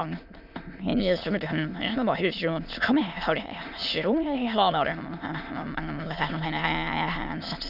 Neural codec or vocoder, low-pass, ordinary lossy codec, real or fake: autoencoder, 22.05 kHz, a latent of 192 numbers a frame, VITS, trained on many speakers; 5.4 kHz; none; fake